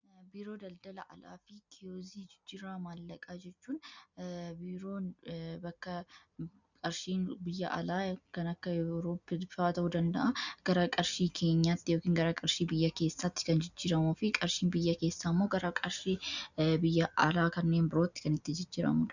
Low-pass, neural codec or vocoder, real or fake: 7.2 kHz; none; real